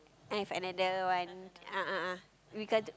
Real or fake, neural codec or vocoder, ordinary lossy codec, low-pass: real; none; none; none